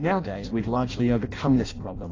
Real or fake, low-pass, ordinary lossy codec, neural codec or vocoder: fake; 7.2 kHz; AAC, 32 kbps; codec, 16 kHz in and 24 kHz out, 0.6 kbps, FireRedTTS-2 codec